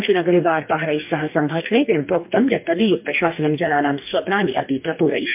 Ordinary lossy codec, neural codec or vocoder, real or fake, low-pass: MP3, 32 kbps; codec, 44.1 kHz, 2.6 kbps, DAC; fake; 3.6 kHz